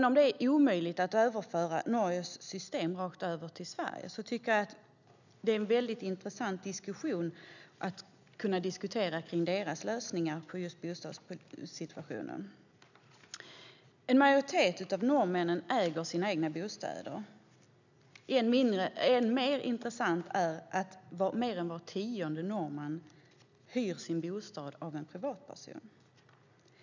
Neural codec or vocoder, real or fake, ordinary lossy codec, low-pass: none; real; none; 7.2 kHz